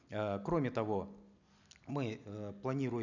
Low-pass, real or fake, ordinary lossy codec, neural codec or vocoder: 7.2 kHz; real; none; none